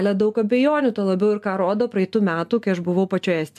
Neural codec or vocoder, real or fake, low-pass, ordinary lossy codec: none; real; 14.4 kHz; AAC, 96 kbps